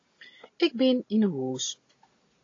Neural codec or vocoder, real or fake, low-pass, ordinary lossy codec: none; real; 7.2 kHz; AAC, 32 kbps